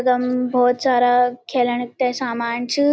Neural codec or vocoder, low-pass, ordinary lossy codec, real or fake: none; none; none; real